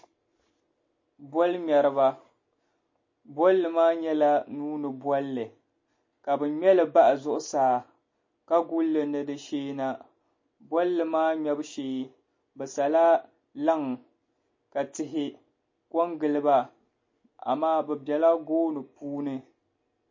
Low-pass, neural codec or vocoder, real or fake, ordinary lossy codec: 7.2 kHz; none; real; MP3, 32 kbps